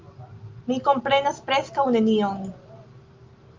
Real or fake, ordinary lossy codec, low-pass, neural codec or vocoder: real; Opus, 24 kbps; 7.2 kHz; none